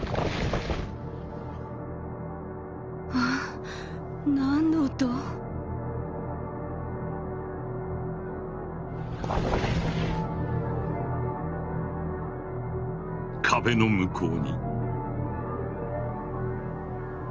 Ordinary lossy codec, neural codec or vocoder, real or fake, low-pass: Opus, 24 kbps; none; real; 7.2 kHz